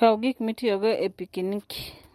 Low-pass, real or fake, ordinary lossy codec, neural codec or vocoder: 19.8 kHz; real; MP3, 64 kbps; none